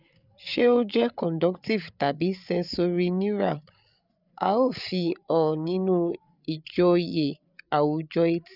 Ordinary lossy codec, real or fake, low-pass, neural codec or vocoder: none; fake; 5.4 kHz; codec, 16 kHz, 8 kbps, FreqCodec, larger model